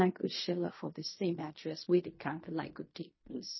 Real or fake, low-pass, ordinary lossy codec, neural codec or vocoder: fake; 7.2 kHz; MP3, 24 kbps; codec, 16 kHz in and 24 kHz out, 0.4 kbps, LongCat-Audio-Codec, fine tuned four codebook decoder